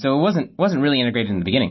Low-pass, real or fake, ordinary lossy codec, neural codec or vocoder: 7.2 kHz; real; MP3, 24 kbps; none